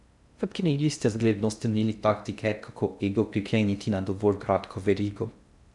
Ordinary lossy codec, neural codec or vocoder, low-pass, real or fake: none; codec, 16 kHz in and 24 kHz out, 0.6 kbps, FocalCodec, streaming, 2048 codes; 10.8 kHz; fake